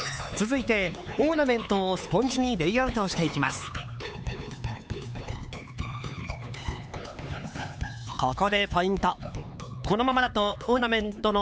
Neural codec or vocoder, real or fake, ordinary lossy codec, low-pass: codec, 16 kHz, 4 kbps, X-Codec, HuBERT features, trained on LibriSpeech; fake; none; none